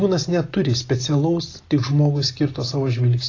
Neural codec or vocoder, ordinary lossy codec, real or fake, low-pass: none; AAC, 32 kbps; real; 7.2 kHz